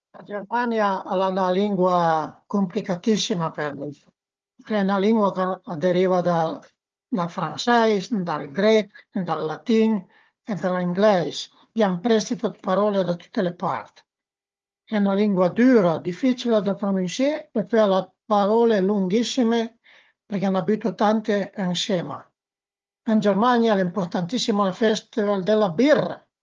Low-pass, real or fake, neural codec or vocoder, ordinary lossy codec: 7.2 kHz; fake; codec, 16 kHz, 4 kbps, FunCodec, trained on Chinese and English, 50 frames a second; Opus, 24 kbps